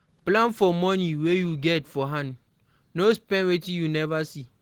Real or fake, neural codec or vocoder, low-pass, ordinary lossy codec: real; none; 19.8 kHz; Opus, 16 kbps